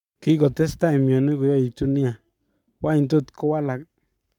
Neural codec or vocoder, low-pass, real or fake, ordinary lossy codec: codec, 44.1 kHz, 7.8 kbps, Pupu-Codec; 19.8 kHz; fake; none